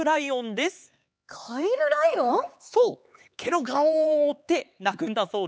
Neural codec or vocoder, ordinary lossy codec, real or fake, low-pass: codec, 16 kHz, 4 kbps, X-Codec, HuBERT features, trained on LibriSpeech; none; fake; none